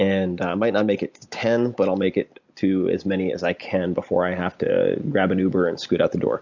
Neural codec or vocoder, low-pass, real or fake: none; 7.2 kHz; real